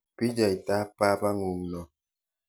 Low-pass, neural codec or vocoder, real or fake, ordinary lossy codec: none; none; real; none